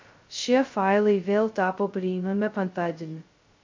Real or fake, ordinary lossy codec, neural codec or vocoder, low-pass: fake; MP3, 48 kbps; codec, 16 kHz, 0.2 kbps, FocalCodec; 7.2 kHz